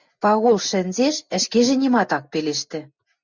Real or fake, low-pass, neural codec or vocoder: real; 7.2 kHz; none